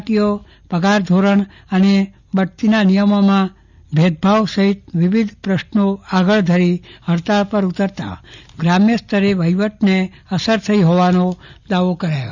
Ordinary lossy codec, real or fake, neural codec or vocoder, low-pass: none; real; none; 7.2 kHz